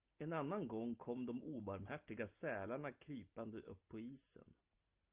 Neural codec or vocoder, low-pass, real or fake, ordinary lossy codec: none; 3.6 kHz; real; Opus, 32 kbps